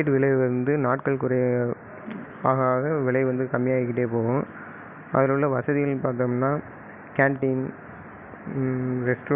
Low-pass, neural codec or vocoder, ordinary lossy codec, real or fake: 3.6 kHz; none; none; real